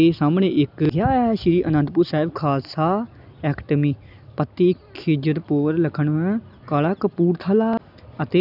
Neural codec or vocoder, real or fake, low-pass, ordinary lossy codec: none; real; 5.4 kHz; none